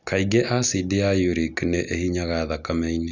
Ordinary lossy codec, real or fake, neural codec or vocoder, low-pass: none; real; none; 7.2 kHz